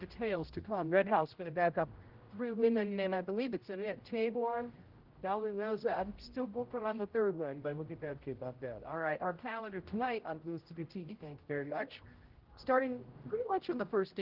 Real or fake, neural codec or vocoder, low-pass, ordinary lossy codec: fake; codec, 16 kHz, 0.5 kbps, X-Codec, HuBERT features, trained on general audio; 5.4 kHz; Opus, 16 kbps